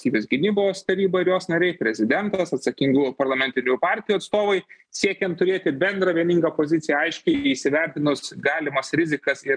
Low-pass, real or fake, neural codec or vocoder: 9.9 kHz; real; none